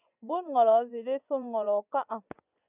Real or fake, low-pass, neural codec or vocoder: fake; 3.6 kHz; vocoder, 24 kHz, 100 mel bands, Vocos